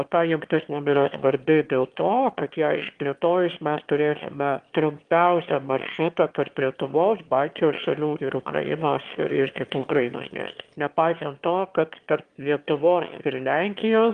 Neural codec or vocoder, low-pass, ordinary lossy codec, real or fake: autoencoder, 22.05 kHz, a latent of 192 numbers a frame, VITS, trained on one speaker; 9.9 kHz; Opus, 24 kbps; fake